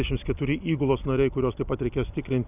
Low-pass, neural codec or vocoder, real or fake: 3.6 kHz; none; real